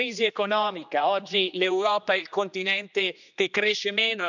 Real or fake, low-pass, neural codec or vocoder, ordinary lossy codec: fake; 7.2 kHz; codec, 16 kHz, 2 kbps, X-Codec, HuBERT features, trained on general audio; none